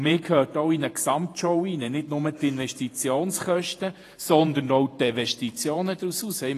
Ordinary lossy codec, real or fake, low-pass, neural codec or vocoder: AAC, 48 kbps; fake; 14.4 kHz; vocoder, 48 kHz, 128 mel bands, Vocos